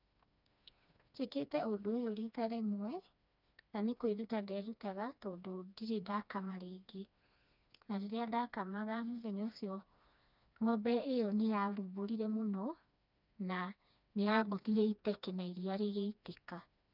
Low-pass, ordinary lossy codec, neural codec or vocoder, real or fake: 5.4 kHz; none; codec, 16 kHz, 2 kbps, FreqCodec, smaller model; fake